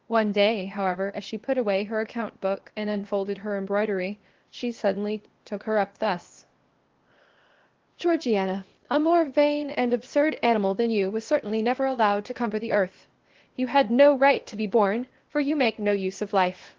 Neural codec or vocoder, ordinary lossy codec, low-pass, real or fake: codec, 16 kHz, 0.8 kbps, ZipCodec; Opus, 16 kbps; 7.2 kHz; fake